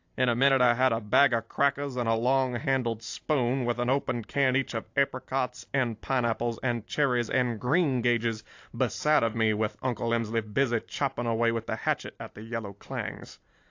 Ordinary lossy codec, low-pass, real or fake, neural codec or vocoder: AAC, 48 kbps; 7.2 kHz; fake; vocoder, 44.1 kHz, 128 mel bands every 512 samples, BigVGAN v2